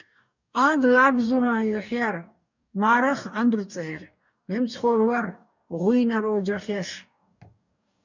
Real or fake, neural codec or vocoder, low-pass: fake; codec, 44.1 kHz, 2.6 kbps, DAC; 7.2 kHz